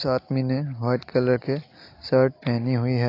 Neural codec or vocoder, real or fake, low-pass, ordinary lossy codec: none; real; 5.4 kHz; AAC, 32 kbps